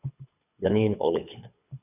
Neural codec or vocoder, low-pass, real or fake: codec, 24 kHz, 6 kbps, HILCodec; 3.6 kHz; fake